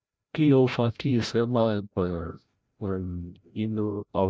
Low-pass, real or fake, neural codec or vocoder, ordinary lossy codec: none; fake; codec, 16 kHz, 0.5 kbps, FreqCodec, larger model; none